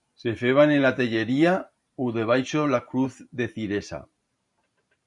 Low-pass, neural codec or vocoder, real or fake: 10.8 kHz; vocoder, 44.1 kHz, 128 mel bands every 512 samples, BigVGAN v2; fake